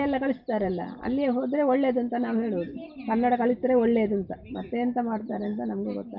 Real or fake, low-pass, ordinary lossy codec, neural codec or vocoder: real; 5.4 kHz; Opus, 24 kbps; none